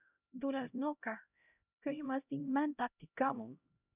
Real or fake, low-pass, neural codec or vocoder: fake; 3.6 kHz; codec, 16 kHz, 0.5 kbps, X-Codec, HuBERT features, trained on LibriSpeech